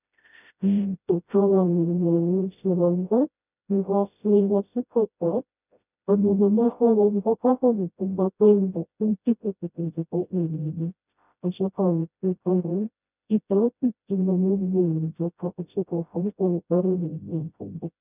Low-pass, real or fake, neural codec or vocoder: 3.6 kHz; fake; codec, 16 kHz, 0.5 kbps, FreqCodec, smaller model